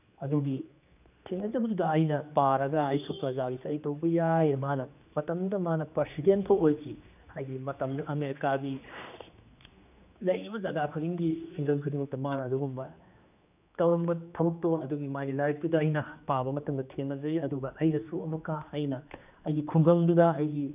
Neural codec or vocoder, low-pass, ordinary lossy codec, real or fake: codec, 16 kHz, 2 kbps, X-Codec, HuBERT features, trained on general audio; 3.6 kHz; none; fake